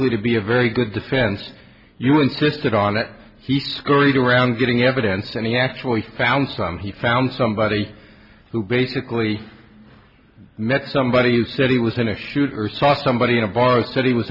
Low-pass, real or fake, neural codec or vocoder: 5.4 kHz; real; none